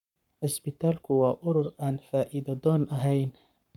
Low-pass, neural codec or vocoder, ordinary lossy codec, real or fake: 19.8 kHz; codec, 44.1 kHz, 7.8 kbps, Pupu-Codec; none; fake